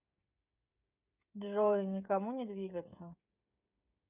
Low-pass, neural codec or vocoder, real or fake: 3.6 kHz; codec, 16 kHz, 16 kbps, FreqCodec, smaller model; fake